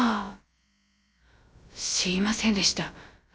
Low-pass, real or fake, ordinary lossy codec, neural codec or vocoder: none; fake; none; codec, 16 kHz, about 1 kbps, DyCAST, with the encoder's durations